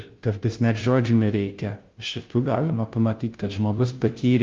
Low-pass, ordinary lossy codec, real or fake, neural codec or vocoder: 7.2 kHz; Opus, 32 kbps; fake; codec, 16 kHz, 0.5 kbps, FunCodec, trained on Chinese and English, 25 frames a second